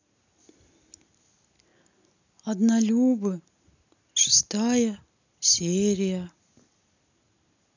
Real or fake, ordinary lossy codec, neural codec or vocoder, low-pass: real; none; none; 7.2 kHz